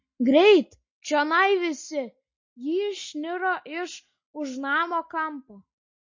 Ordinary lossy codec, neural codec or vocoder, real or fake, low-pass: MP3, 32 kbps; none; real; 7.2 kHz